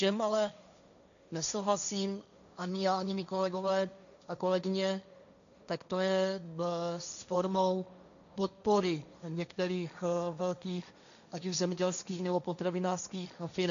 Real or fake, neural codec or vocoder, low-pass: fake; codec, 16 kHz, 1.1 kbps, Voila-Tokenizer; 7.2 kHz